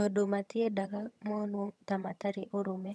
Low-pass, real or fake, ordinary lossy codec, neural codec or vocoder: none; fake; none; vocoder, 22.05 kHz, 80 mel bands, HiFi-GAN